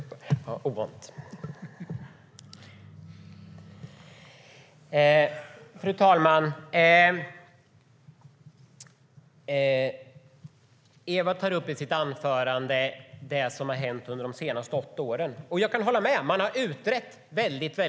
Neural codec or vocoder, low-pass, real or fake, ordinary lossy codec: none; none; real; none